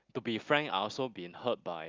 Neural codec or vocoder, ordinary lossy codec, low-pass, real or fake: none; Opus, 24 kbps; 7.2 kHz; real